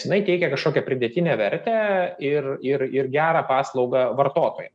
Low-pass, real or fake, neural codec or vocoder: 10.8 kHz; real; none